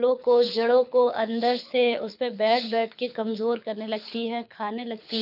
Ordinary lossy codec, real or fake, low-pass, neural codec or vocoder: none; fake; 5.4 kHz; codec, 24 kHz, 6 kbps, HILCodec